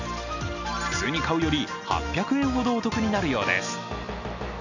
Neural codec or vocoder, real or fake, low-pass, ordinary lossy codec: none; real; 7.2 kHz; none